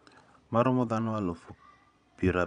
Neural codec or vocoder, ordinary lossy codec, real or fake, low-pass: vocoder, 22.05 kHz, 80 mel bands, Vocos; none; fake; 9.9 kHz